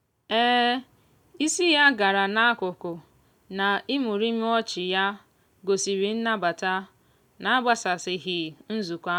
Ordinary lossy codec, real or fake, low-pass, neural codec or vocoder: none; real; 19.8 kHz; none